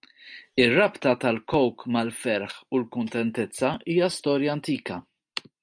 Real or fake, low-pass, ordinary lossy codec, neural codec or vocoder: real; 9.9 kHz; MP3, 64 kbps; none